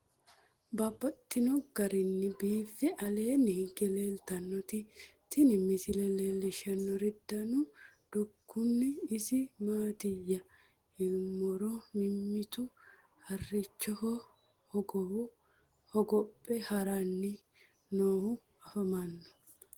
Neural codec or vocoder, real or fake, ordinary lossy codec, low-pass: none; real; Opus, 16 kbps; 19.8 kHz